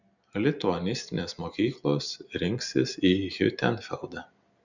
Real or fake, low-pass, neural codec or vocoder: real; 7.2 kHz; none